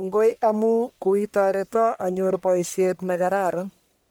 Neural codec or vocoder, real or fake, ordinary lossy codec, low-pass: codec, 44.1 kHz, 1.7 kbps, Pupu-Codec; fake; none; none